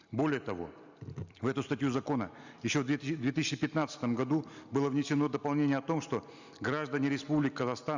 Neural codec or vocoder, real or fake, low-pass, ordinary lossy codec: none; real; 7.2 kHz; Opus, 64 kbps